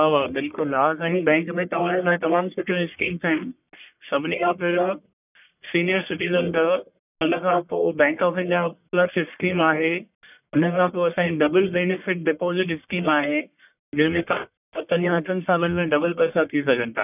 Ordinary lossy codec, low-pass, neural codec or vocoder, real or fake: none; 3.6 kHz; codec, 44.1 kHz, 1.7 kbps, Pupu-Codec; fake